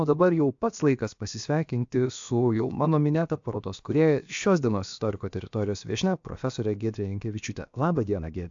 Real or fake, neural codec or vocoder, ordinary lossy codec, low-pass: fake; codec, 16 kHz, about 1 kbps, DyCAST, with the encoder's durations; AAC, 64 kbps; 7.2 kHz